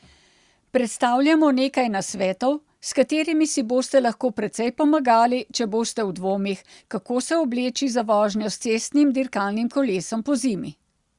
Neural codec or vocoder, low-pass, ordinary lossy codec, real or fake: none; 10.8 kHz; Opus, 64 kbps; real